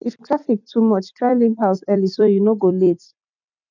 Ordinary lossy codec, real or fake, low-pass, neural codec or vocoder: none; fake; 7.2 kHz; vocoder, 44.1 kHz, 128 mel bands every 256 samples, BigVGAN v2